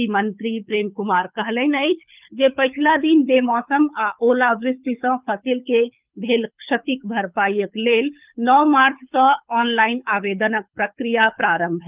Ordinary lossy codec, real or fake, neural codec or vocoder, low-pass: Opus, 24 kbps; fake; codec, 24 kHz, 6 kbps, HILCodec; 3.6 kHz